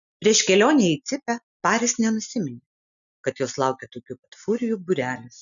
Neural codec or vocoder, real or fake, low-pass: none; real; 7.2 kHz